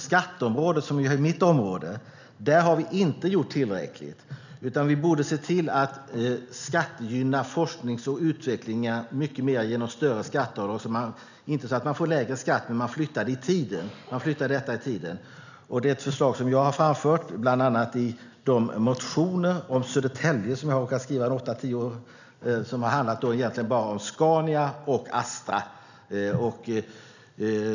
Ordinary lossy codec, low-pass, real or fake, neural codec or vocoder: none; 7.2 kHz; real; none